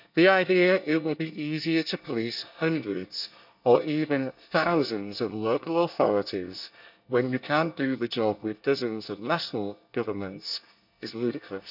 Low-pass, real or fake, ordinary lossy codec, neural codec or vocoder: 5.4 kHz; fake; none; codec, 24 kHz, 1 kbps, SNAC